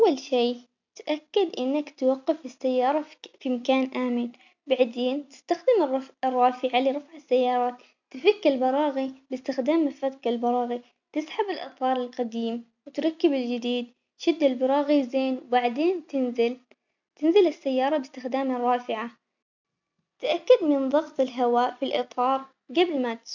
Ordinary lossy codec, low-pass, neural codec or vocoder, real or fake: none; 7.2 kHz; none; real